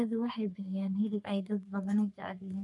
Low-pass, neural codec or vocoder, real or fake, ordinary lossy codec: 10.8 kHz; codec, 44.1 kHz, 3.4 kbps, Pupu-Codec; fake; none